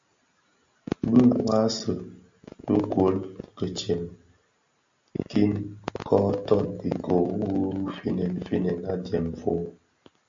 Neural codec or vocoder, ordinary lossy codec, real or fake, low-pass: none; MP3, 48 kbps; real; 7.2 kHz